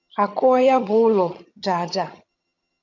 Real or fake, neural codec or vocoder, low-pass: fake; vocoder, 22.05 kHz, 80 mel bands, HiFi-GAN; 7.2 kHz